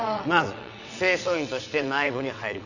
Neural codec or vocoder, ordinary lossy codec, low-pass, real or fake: vocoder, 22.05 kHz, 80 mel bands, WaveNeXt; none; 7.2 kHz; fake